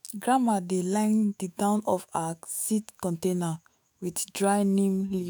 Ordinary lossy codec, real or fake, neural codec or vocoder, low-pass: none; fake; autoencoder, 48 kHz, 128 numbers a frame, DAC-VAE, trained on Japanese speech; none